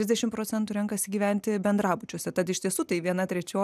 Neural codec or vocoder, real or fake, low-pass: none; real; 14.4 kHz